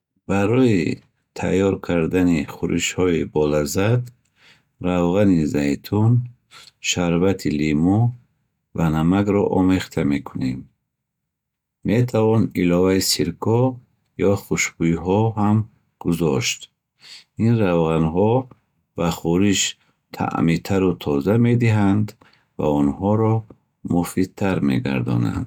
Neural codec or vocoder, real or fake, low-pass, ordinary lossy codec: none; real; 19.8 kHz; none